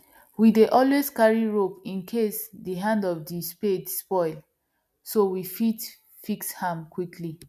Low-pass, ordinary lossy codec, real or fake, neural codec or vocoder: 14.4 kHz; none; real; none